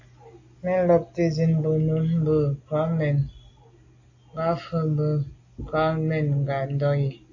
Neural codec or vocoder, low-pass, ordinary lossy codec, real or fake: none; 7.2 kHz; AAC, 48 kbps; real